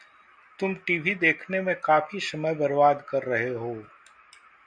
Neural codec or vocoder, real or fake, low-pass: none; real; 9.9 kHz